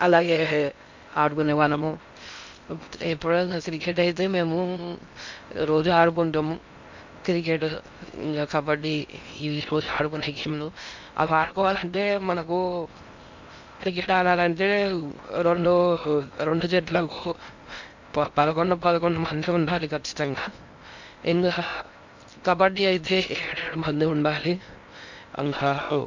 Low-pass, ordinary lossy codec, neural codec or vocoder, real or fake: 7.2 kHz; MP3, 64 kbps; codec, 16 kHz in and 24 kHz out, 0.6 kbps, FocalCodec, streaming, 2048 codes; fake